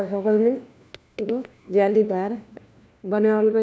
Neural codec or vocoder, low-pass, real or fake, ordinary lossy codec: codec, 16 kHz, 1 kbps, FunCodec, trained on LibriTTS, 50 frames a second; none; fake; none